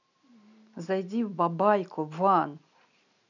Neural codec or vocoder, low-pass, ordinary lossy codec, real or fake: none; 7.2 kHz; none; real